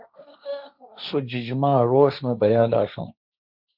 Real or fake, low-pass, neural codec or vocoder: fake; 5.4 kHz; codec, 16 kHz, 1.1 kbps, Voila-Tokenizer